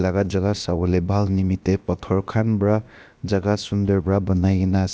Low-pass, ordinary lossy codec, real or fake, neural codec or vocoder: none; none; fake; codec, 16 kHz, about 1 kbps, DyCAST, with the encoder's durations